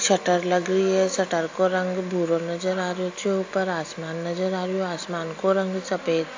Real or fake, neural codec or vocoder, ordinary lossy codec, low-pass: real; none; AAC, 48 kbps; 7.2 kHz